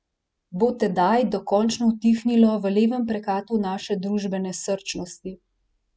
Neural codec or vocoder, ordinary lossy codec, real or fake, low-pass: none; none; real; none